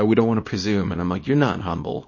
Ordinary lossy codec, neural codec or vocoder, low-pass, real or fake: MP3, 32 kbps; codec, 24 kHz, 0.9 kbps, WavTokenizer, small release; 7.2 kHz; fake